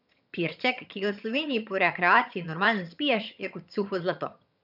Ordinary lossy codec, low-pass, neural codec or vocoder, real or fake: none; 5.4 kHz; vocoder, 22.05 kHz, 80 mel bands, HiFi-GAN; fake